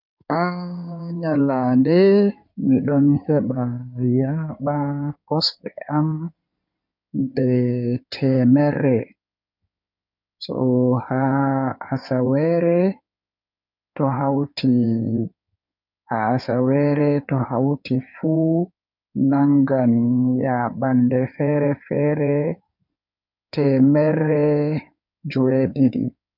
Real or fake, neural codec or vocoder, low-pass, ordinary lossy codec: fake; codec, 16 kHz in and 24 kHz out, 2.2 kbps, FireRedTTS-2 codec; 5.4 kHz; none